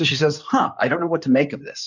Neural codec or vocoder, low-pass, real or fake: codec, 16 kHz in and 24 kHz out, 2.2 kbps, FireRedTTS-2 codec; 7.2 kHz; fake